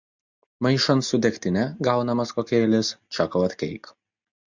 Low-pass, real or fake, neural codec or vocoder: 7.2 kHz; real; none